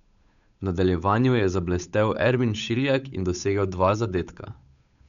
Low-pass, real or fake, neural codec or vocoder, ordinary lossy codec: 7.2 kHz; fake; codec, 16 kHz, 8 kbps, FunCodec, trained on Chinese and English, 25 frames a second; none